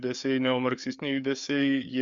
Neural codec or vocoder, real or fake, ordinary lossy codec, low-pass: codec, 16 kHz, 4 kbps, FreqCodec, larger model; fake; Opus, 64 kbps; 7.2 kHz